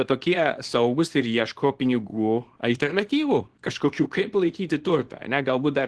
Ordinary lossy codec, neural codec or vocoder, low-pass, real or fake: Opus, 16 kbps; codec, 24 kHz, 0.9 kbps, WavTokenizer, small release; 10.8 kHz; fake